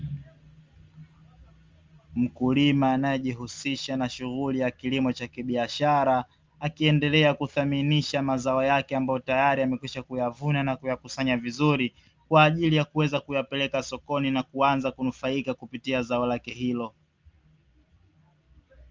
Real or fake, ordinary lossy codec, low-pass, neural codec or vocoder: real; Opus, 32 kbps; 7.2 kHz; none